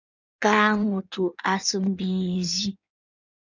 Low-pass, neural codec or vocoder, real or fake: 7.2 kHz; codec, 16 kHz in and 24 kHz out, 1.1 kbps, FireRedTTS-2 codec; fake